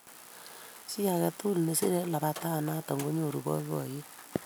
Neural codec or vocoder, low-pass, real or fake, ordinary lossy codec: none; none; real; none